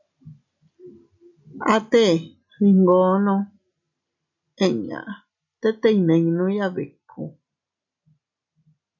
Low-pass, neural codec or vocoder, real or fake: 7.2 kHz; none; real